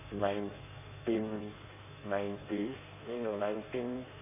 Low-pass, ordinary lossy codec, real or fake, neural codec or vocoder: 3.6 kHz; none; fake; codec, 16 kHz in and 24 kHz out, 1.1 kbps, FireRedTTS-2 codec